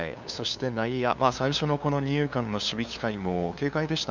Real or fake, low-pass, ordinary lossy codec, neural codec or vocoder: fake; 7.2 kHz; none; codec, 16 kHz, 2 kbps, FunCodec, trained on LibriTTS, 25 frames a second